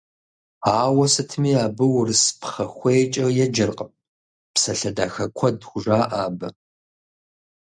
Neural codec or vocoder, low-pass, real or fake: none; 9.9 kHz; real